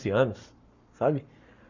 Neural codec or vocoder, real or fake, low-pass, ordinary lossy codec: codec, 44.1 kHz, 7.8 kbps, Pupu-Codec; fake; 7.2 kHz; none